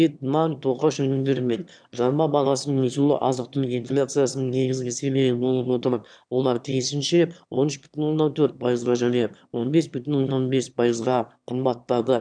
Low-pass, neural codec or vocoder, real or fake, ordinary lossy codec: 9.9 kHz; autoencoder, 22.05 kHz, a latent of 192 numbers a frame, VITS, trained on one speaker; fake; none